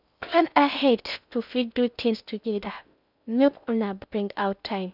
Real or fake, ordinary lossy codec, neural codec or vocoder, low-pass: fake; none; codec, 16 kHz in and 24 kHz out, 0.6 kbps, FocalCodec, streaming, 2048 codes; 5.4 kHz